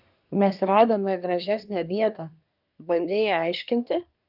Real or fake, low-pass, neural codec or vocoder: fake; 5.4 kHz; codec, 24 kHz, 1 kbps, SNAC